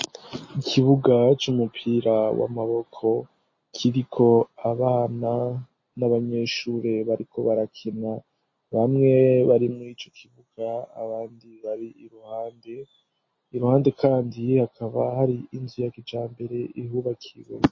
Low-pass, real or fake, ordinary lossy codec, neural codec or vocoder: 7.2 kHz; real; MP3, 32 kbps; none